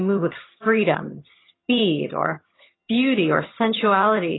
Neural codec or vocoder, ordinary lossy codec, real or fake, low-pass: vocoder, 22.05 kHz, 80 mel bands, HiFi-GAN; AAC, 16 kbps; fake; 7.2 kHz